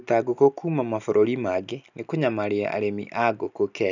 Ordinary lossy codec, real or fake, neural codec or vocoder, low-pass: none; real; none; 7.2 kHz